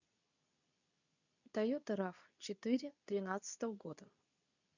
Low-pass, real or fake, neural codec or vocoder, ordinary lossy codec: 7.2 kHz; fake; codec, 24 kHz, 0.9 kbps, WavTokenizer, medium speech release version 2; none